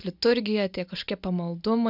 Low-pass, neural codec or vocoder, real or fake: 5.4 kHz; none; real